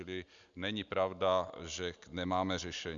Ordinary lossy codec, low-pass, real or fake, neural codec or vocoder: Opus, 64 kbps; 7.2 kHz; real; none